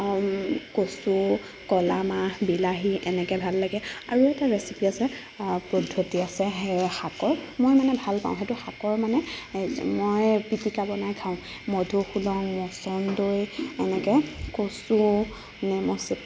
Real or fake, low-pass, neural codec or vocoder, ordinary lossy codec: real; none; none; none